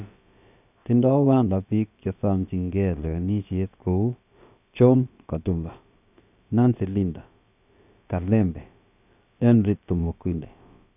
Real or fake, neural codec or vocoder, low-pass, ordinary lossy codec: fake; codec, 16 kHz, about 1 kbps, DyCAST, with the encoder's durations; 3.6 kHz; none